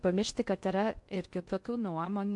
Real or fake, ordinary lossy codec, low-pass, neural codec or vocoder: fake; MP3, 64 kbps; 10.8 kHz; codec, 16 kHz in and 24 kHz out, 0.6 kbps, FocalCodec, streaming, 2048 codes